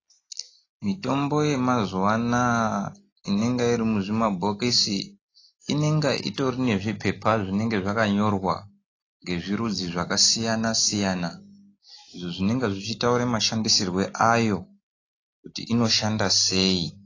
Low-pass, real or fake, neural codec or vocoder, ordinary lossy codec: 7.2 kHz; real; none; AAC, 32 kbps